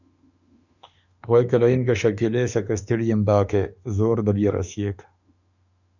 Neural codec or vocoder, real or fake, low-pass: autoencoder, 48 kHz, 32 numbers a frame, DAC-VAE, trained on Japanese speech; fake; 7.2 kHz